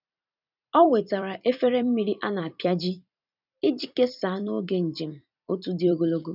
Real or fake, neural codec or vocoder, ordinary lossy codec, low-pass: fake; vocoder, 44.1 kHz, 128 mel bands every 256 samples, BigVGAN v2; none; 5.4 kHz